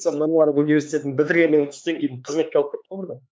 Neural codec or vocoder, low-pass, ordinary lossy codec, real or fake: codec, 16 kHz, 4 kbps, X-Codec, HuBERT features, trained on LibriSpeech; none; none; fake